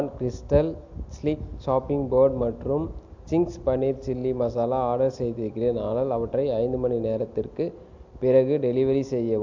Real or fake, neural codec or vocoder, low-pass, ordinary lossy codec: real; none; 7.2 kHz; MP3, 64 kbps